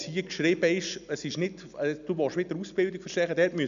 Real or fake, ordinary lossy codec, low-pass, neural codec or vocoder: real; none; 7.2 kHz; none